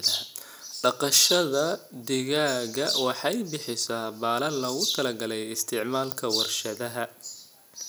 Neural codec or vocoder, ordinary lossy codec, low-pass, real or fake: none; none; none; real